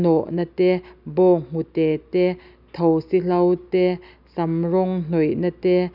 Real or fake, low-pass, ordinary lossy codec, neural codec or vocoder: real; 5.4 kHz; none; none